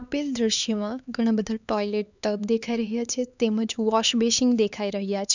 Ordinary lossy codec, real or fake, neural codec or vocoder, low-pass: none; fake; codec, 16 kHz, 4 kbps, X-Codec, HuBERT features, trained on LibriSpeech; 7.2 kHz